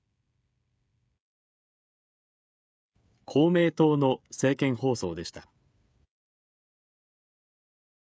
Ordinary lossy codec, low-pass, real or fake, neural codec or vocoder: none; none; fake; codec, 16 kHz, 16 kbps, FreqCodec, smaller model